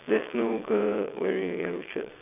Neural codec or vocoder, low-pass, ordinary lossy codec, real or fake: vocoder, 22.05 kHz, 80 mel bands, WaveNeXt; 3.6 kHz; none; fake